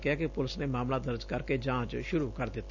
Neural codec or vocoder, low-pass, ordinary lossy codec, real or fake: none; 7.2 kHz; none; real